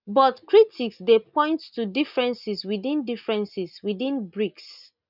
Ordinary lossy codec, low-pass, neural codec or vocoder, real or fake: none; 5.4 kHz; none; real